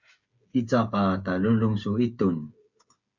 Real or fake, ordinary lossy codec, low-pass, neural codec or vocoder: fake; Opus, 64 kbps; 7.2 kHz; codec, 16 kHz, 8 kbps, FreqCodec, smaller model